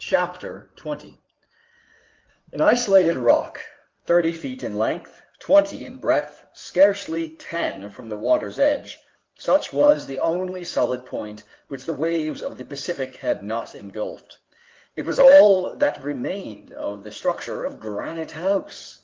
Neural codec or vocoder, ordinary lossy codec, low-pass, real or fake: codec, 16 kHz in and 24 kHz out, 2.2 kbps, FireRedTTS-2 codec; Opus, 32 kbps; 7.2 kHz; fake